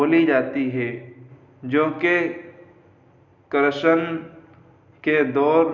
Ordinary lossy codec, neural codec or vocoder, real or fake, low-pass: none; none; real; 7.2 kHz